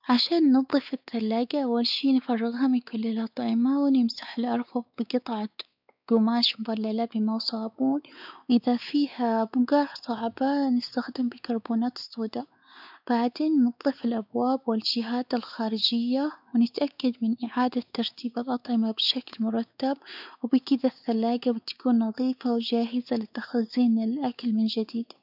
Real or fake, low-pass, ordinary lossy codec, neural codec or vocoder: fake; 5.4 kHz; none; codec, 16 kHz, 4 kbps, X-Codec, WavLM features, trained on Multilingual LibriSpeech